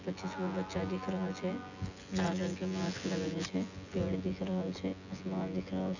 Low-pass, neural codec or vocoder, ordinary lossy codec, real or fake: 7.2 kHz; vocoder, 24 kHz, 100 mel bands, Vocos; none; fake